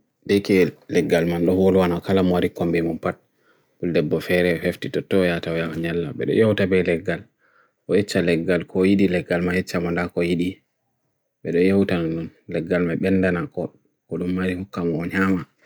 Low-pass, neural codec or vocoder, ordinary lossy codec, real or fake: none; none; none; real